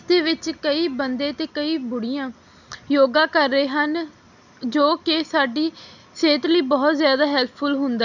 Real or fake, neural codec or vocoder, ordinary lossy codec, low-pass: real; none; none; 7.2 kHz